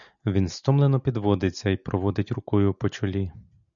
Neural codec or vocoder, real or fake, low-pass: none; real; 7.2 kHz